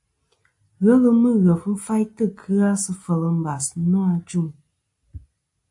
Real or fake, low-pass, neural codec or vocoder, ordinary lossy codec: real; 10.8 kHz; none; AAC, 64 kbps